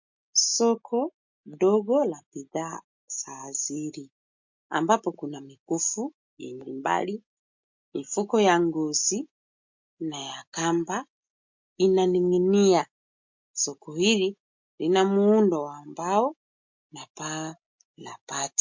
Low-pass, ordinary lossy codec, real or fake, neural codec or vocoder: 7.2 kHz; MP3, 48 kbps; real; none